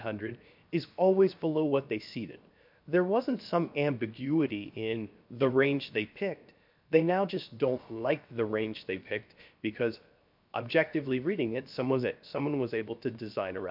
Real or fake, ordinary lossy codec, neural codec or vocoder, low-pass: fake; MP3, 48 kbps; codec, 16 kHz, 0.7 kbps, FocalCodec; 5.4 kHz